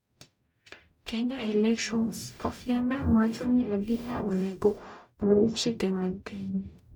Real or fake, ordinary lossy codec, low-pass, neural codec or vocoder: fake; none; 19.8 kHz; codec, 44.1 kHz, 0.9 kbps, DAC